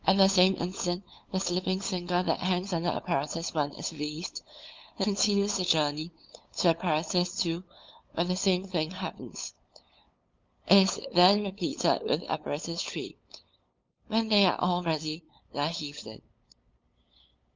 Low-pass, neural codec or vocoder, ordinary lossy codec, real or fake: 7.2 kHz; codec, 16 kHz, 8 kbps, FunCodec, trained on LibriTTS, 25 frames a second; Opus, 24 kbps; fake